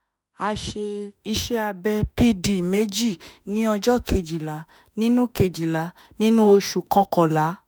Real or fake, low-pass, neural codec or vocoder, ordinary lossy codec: fake; none; autoencoder, 48 kHz, 32 numbers a frame, DAC-VAE, trained on Japanese speech; none